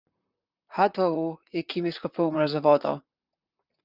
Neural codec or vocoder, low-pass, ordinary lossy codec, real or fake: vocoder, 44.1 kHz, 128 mel bands, Pupu-Vocoder; 5.4 kHz; Opus, 64 kbps; fake